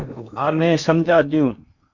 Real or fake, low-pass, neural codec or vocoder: fake; 7.2 kHz; codec, 16 kHz in and 24 kHz out, 0.8 kbps, FocalCodec, streaming, 65536 codes